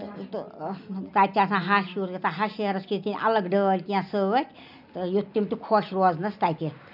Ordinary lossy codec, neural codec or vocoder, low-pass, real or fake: none; none; 5.4 kHz; real